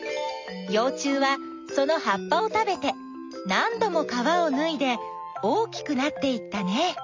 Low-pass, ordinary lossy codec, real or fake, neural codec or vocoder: 7.2 kHz; MP3, 64 kbps; real; none